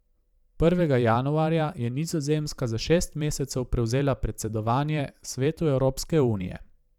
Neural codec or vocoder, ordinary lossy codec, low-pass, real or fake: vocoder, 44.1 kHz, 128 mel bands every 512 samples, BigVGAN v2; none; 19.8 kHz; fake